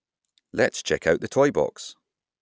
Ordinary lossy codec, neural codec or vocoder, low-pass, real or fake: none; none; none; real